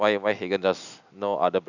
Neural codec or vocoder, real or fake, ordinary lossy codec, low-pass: none; real; none; 7.2 kHz